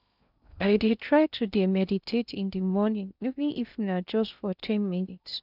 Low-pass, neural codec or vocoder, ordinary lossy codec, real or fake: 5.4 kHz; codec, 16 kHz in and 24 kHz out, 0.6 kbps, FocalCodec, streaming, 2048 codes; none; fake